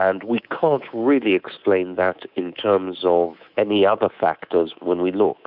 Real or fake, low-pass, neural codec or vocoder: fake; 5.4 kHz; codec, 24 kHz, 3.1 kbps, DualCodec